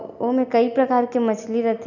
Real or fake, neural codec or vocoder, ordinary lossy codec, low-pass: real; none; none; 7.2 kHz